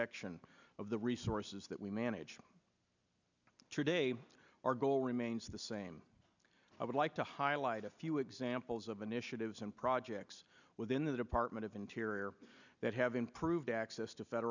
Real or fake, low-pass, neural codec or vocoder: real; 7.2 kHz; none